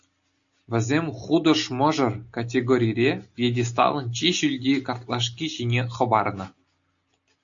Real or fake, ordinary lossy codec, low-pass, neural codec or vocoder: real; AAC, 64 kbps; 7.2 kHz; none